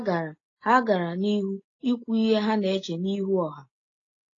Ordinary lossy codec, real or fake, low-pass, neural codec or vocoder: AAC, 32 kbps; real; 7.2 kHz; none